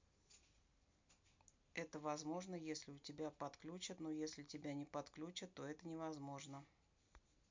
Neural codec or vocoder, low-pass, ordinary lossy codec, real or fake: none; 7.2 kHz; MP3, 64 kbps; real